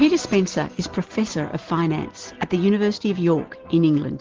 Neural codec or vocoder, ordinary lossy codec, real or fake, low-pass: none; Opus, 16 kbps; real; 7.2 kHz